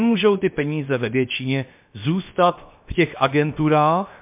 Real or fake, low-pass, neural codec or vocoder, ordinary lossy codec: fake; 3.6 kHz; codec, 16 kHz, about 1 kbps, DyCAST, with the encoder's durations; MP3, 32 kbps